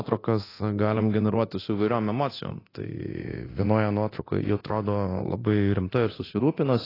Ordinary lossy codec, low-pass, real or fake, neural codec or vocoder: AAC, 24 kbps; 5.4 kHz; fake; codec, 24 kHz, 0.9 kbps, DualCodec